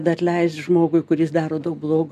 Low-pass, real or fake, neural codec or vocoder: 14.4 kHz; real; none